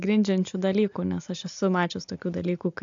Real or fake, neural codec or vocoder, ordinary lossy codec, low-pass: real; none; AAC, 64 kbps; 7.2 kHz